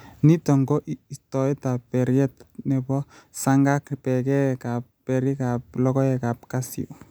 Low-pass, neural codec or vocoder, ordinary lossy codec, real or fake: none; none; none; real